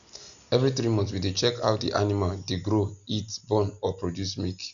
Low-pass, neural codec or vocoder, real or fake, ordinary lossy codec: 7.2 kHz; none; real; none